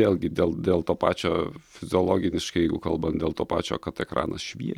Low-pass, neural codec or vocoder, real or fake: 19.8 kHz; none; real